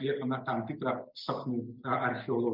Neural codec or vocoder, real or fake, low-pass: none; real; 5.4 kHz